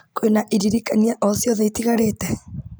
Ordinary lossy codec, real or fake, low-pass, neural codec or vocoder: none; real; none; none